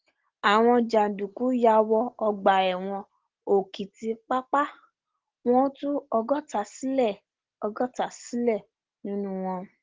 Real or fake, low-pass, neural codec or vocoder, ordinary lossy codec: real; 7.2 kHz; none; Opus, 16 kbps